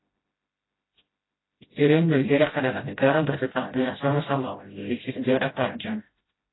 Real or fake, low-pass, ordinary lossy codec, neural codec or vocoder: fake; 7.2 kHz; AAC, 16 kbps; codec, 16 kHz, 0.5 kbps, FreqCodec, smaller model